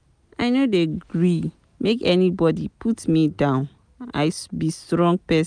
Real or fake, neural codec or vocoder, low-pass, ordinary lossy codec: real; none; 9.9 kHz; none